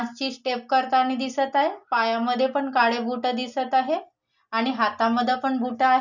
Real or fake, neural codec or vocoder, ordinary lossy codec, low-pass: real; none; none; 7.2 kHz